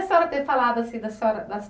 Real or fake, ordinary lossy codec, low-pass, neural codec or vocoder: real; none; none; none